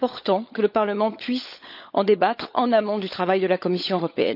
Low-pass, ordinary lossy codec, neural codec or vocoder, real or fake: 5.4 kHz; none; codec, 16 kHz, 16 kbps, FunCodec, trained on LibriTTS, 50 frames a second; fake